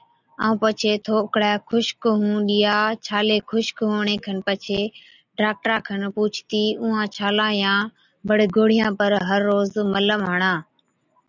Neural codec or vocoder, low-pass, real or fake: none; 7.2 kHz; real